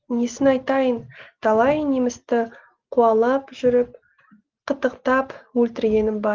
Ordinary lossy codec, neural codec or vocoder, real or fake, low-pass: Opus, 16 kbps; none; real; 7.2 kHz